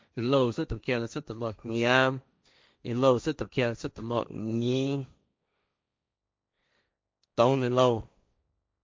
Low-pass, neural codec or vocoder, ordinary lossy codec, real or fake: none; codec, 16 kHz, 1.1 kbps, Voila-Tokenizer; none; fake